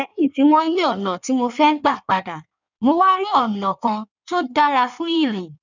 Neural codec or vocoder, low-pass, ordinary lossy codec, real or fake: codec, 16 kHz in and 24 kHz out, 1.1 kbps, FireRedTTS-2 codec; 7.2 kHz; none; fake